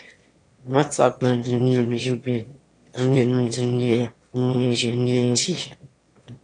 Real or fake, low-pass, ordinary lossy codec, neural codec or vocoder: fake; 9.9 kHz; AAC, 48 kbps; autoencoder, 22.05 kHz, a latent of 192 numbers a frame, VITS, trained on one speaker